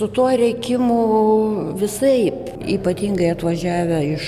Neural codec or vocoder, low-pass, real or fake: none; 14.4 kHz; real